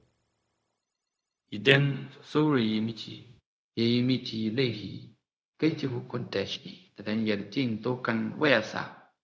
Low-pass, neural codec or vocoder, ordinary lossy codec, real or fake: none; codec, 16 kHz, 0.4 kbps, LongCat-Audio-Codec; none; fake